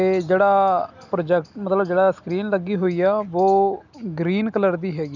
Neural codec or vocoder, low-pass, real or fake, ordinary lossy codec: none; 7.2 kHz; real; none